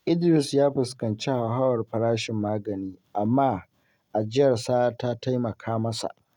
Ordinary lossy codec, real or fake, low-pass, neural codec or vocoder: none; real; 19.8 kHz; none